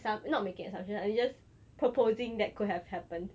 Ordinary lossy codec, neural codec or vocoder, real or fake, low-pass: none; none; real; none